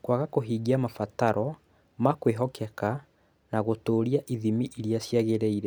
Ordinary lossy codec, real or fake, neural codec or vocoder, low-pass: none; real; none; none